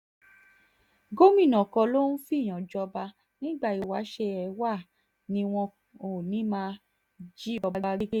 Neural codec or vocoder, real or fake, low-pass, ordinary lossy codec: none; real; 19.8 kHz; none